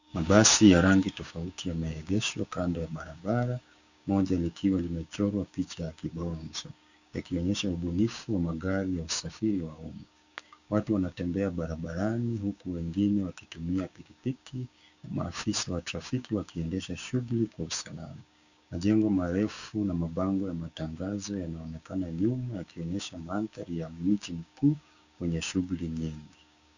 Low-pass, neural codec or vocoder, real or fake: 7.2 kHz; codec, 16 kHz, 6 kbps, DAC; fake